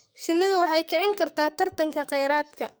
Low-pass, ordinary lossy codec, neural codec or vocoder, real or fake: none; none; codec, 44.1 kHz, 2.6 kbps, SNAC; fake